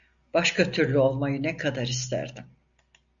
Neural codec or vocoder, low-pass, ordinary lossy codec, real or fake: none; 7.2 kHz; MP3, 96 kbps; real